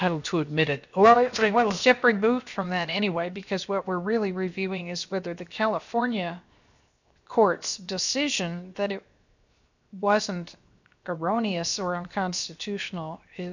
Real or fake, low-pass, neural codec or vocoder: fake; 7.2 kHz; codec, 16 kHz, 0.7 kbps, FocalCodec